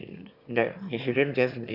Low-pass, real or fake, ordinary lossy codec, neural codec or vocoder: 5.4 kHz; fake; none; autoencoder, 22.05 kHz, a latent of 192 numbers a frame, VITS, trained on one speaker